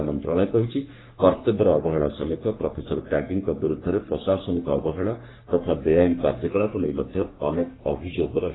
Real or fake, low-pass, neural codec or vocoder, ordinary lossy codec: fake; 7.2 kHz; codec, 44.1 kHz, 3.4 kbps, Pupu-Codec; AAC, 16 kbps